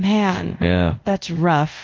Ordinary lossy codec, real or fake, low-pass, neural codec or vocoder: Opus, 24 kbps; fake; 7.2 kHz; codec, 24 kHz, 0.5 kbps, DualCodec